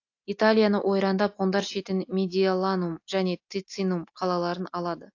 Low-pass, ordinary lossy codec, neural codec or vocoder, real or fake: 7.2 kHz; AAC, 48 kbps; none; real